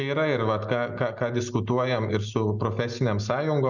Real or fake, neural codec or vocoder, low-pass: real; none; 7.2 kHz